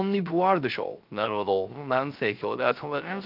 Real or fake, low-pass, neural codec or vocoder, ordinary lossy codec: fake; 5.4 kHz; codec, 16 kHz, 0.3 kbps, FocalCodec; Opus, 24 kbps